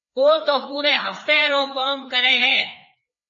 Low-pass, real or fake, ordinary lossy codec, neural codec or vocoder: 7.2 kHz; fake; MP3, 32 kbps; codec, 16 kHz, 2 kbps, FreqCodec, larger model